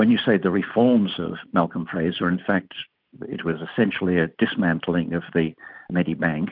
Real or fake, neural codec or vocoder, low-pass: real; none; 5.4 kHz